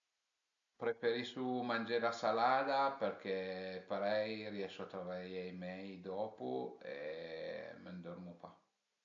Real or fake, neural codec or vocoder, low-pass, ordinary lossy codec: real; none; 7.2 kHz; AAC, 64 kbps